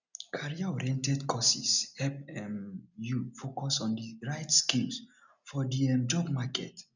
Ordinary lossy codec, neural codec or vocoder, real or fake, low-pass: none; none; real; 7.2 kHz